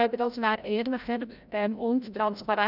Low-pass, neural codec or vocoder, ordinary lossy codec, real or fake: 5.4 kHz; codec, 16 kHz, 0.5 kbps, FreqCodec, larger model; none; fake